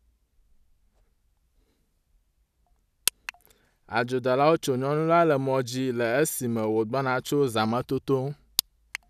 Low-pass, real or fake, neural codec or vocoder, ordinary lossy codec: 14.4 kHz; real; none; none